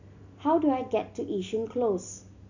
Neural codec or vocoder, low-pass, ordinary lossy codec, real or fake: none; 7.2 kHz; none; real